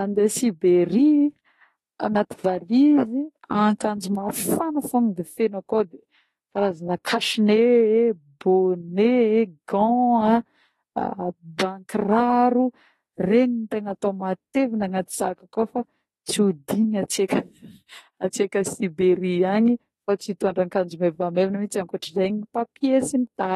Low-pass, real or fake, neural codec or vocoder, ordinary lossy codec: 19.8 kHz; fake; autoencoder, 48 kHz, 32 numbers a frame, DAC-VAE, trained on Japanese speech; AAC, 32 kbps